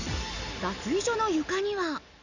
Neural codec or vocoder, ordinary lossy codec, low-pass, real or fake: none; none; 7.2 kHz; real